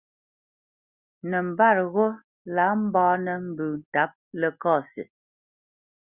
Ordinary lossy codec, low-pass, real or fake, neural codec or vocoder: Opus, 64 kbps; 3.6 kHz; real; none